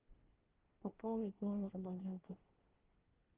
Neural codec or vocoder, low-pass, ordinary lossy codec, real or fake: codec, 24 kHz, 1 kbps, SNAC; 3.6 kHz; Opus, 16 kbps; fake